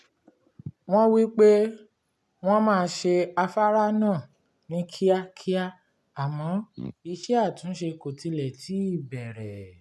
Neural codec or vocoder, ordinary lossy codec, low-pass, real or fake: none; none; none; real